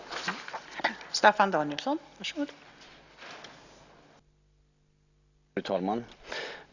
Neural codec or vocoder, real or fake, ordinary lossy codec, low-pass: none; real; none; 7.2 kHz